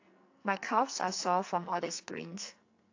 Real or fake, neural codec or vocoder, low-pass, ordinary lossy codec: fake; codec, 44.1 kHz, 2.6 kbps, SNAC; 7.2 kHz; AAC, 48 kbps